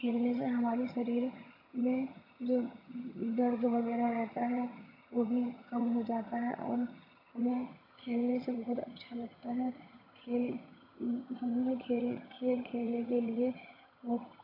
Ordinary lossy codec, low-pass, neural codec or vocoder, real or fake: AAC, 32 kbps; 5.4 kHz; vocoder, 22.05 kHz, 80 mel bands, HiFi-GAN; fake